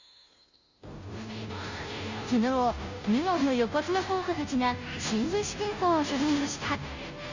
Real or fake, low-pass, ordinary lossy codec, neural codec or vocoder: fake; 7.2 kHz; Opus, 64 kbps; codec, 16 kHz, 0.5 kbps, FunCodec, trained on Chinese and English, 25 frames a second